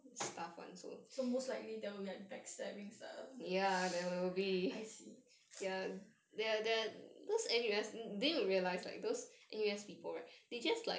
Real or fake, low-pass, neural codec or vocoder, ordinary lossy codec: real; none; none; none